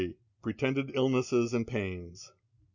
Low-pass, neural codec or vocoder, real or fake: 7.2 kHz; none; real